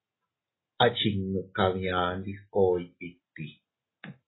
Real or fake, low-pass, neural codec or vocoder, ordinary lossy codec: real; 7.2 kHz; none; AAC, 16 kbps